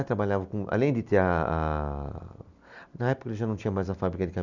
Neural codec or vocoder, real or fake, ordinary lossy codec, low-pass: none; real; none; 7.2 kHz